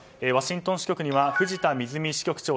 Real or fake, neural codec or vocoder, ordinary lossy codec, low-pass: real; none; none; none